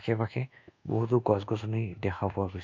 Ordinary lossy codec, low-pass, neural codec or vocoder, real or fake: MP3, 64 kbps; 7.2 kHz; codec, 24 kHz, 1.2 kbps, DualCodec; fake